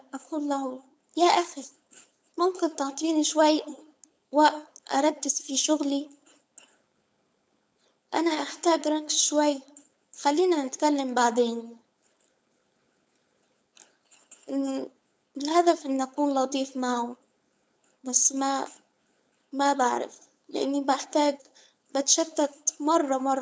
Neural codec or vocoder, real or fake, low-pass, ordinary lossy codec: codec, 16 kHz, 4.8 kbps, FACodec; fake; none; none